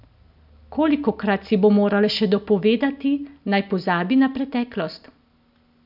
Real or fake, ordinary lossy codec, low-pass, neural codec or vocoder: real; none; 5.4 kHz; none